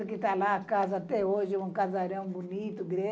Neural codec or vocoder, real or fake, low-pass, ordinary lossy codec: none; real; none; none